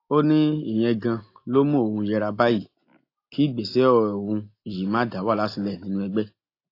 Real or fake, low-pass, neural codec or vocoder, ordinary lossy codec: real; 5.4 kHz; none; AAC, 32 kbps